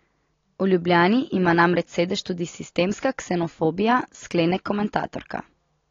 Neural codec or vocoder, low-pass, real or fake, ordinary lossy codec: none; 7.2 kHz; real; AAC, 32 kbps